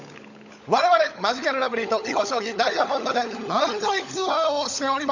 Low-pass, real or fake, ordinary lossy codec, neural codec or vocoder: 7.2 kHz; fake; none; codec, 16 kHz, 16 kbps, FunCodec, trained on LibriTTS, 50 frames a second